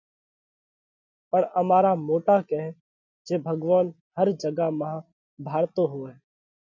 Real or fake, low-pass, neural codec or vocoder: real; 7.2 kHz; none